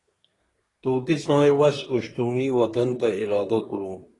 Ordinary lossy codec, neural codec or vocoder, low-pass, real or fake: AAC, 32 kbps; codec, 24 kHz, 1 kbps, SNAC; 10.8 kHz; fake